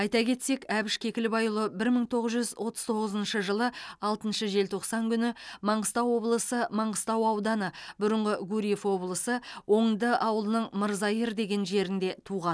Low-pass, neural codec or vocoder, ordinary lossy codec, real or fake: none; none; none; real